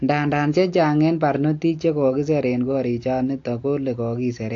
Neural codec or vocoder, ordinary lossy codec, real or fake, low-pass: none; AAC, 48 kbps; real; 7.2 kHz